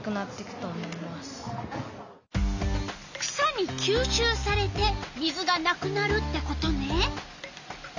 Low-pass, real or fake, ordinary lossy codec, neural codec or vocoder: 7.2 kHz; real; none; none